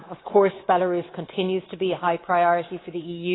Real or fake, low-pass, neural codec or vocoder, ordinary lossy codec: fake; 7.2 kHz; codec, 24 kHz, 3.1 kbps, DualCodec; AAC, 16 kbps